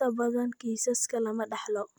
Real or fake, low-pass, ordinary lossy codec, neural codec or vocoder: real; none; none; none